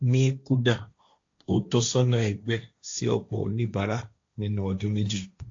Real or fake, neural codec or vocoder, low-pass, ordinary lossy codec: fake; codec, 16 kHz, 1.1 kbps, Voila-Tokenizer; 7.2 kHz; AAC, 48 kbps